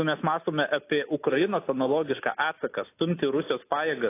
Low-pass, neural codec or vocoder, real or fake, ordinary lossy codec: 3.6 kHz; none; real; AAC, 24 kbps